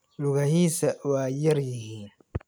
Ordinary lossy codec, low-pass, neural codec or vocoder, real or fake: none; none; none; real